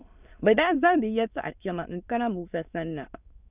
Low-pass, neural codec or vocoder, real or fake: 3.6 kHz; autoencoder, 22.05 kHz, a latent of 192 numbers a frame, VITS, trained on many speakers; fake